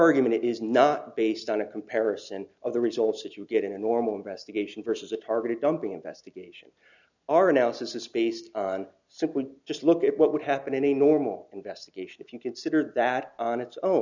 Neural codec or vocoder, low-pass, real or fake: none; 7.2 kHz; real